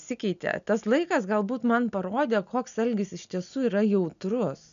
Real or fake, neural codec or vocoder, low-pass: real; none; 7.2 kHz